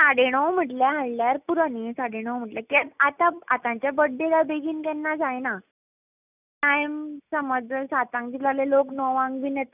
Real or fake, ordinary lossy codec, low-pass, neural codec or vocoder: real; none; 3.6 kHz; none